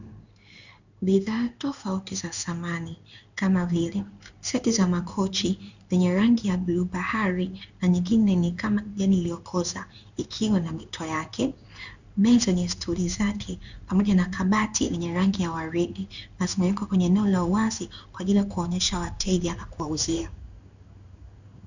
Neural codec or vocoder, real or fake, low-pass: codec, 16 kHz in and 24 kHz out, 1 kbps, XY-Tokenizer; fake; 7.2 kHz